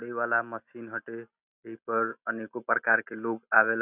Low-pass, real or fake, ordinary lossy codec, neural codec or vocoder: 3.6 kHz; real; none; none